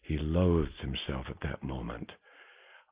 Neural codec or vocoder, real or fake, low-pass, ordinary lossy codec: none; real; 3.6 kHz; Opus, 24 kbps